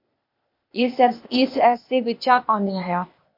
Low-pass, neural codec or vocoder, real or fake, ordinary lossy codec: 5.4 kHz; codec, 16 kHz, 0.8 kbps, ZipCodec; fake; MP3, 32 kbps